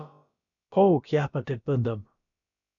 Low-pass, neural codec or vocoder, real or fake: 7.2 kHz; codec, 16 kHz, about 1 kbps, DyCAST, with the encoder's durations; fake